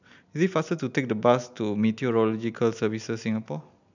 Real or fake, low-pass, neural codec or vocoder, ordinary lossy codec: real; 7.2 kHz; none; none